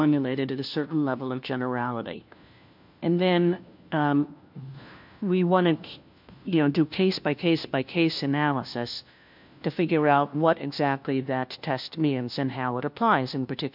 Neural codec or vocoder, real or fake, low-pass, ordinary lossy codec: codec, 16 kHz, 1 kbps, FunCodec, trained on LibriTTS, 50 frames a second; fake; 5.4 kHz; MP3, 48 kbps